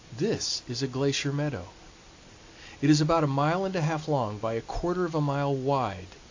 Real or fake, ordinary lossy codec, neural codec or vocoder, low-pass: real; AAC, 48 kbps; none; 7.2 kHz